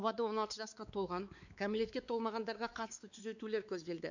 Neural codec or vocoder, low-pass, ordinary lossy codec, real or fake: codec, 16 kHz, 4 kbps, X-Codec, WavLM features, trained on Multilingual LibriSpeech; 7.2 kHz; none; fake